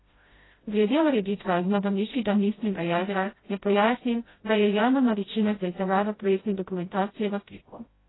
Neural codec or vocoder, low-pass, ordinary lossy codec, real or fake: codec, 16 kHz, 0.5 kbps, FreqCodec, smaller model; 7.2 kHz; AAC, 16 kbps; fake